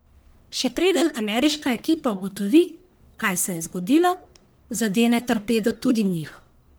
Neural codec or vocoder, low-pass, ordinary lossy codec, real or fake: codec, 44.1 kHz, 1.7 kbps, Pupu-Codec; none; none; fake